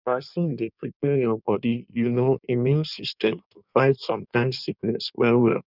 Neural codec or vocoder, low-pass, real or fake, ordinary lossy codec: codec, 16 kHz in and 24 kHz out, 1.1 kbps, FireRedTTS-2 codec; 5.4 kHz; fake; none